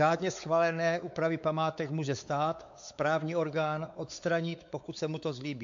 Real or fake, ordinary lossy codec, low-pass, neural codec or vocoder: fake; AAC, 64 kbps; 7.2 kHz; codec, 16 kHz, 4 kbps, X-Codec, WavLM features, trained on Multilingual LibriSpeech